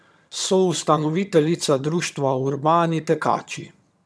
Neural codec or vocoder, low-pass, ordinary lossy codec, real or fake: vocoder, 22.05 kHz, 80 mel bands, HiFi-GAN; none; none; fake